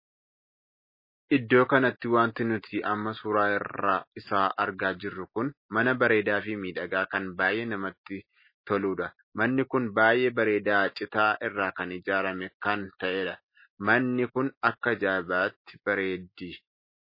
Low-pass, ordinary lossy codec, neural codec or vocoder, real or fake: 5.4 kHz; MP3, 24 kbps; none; real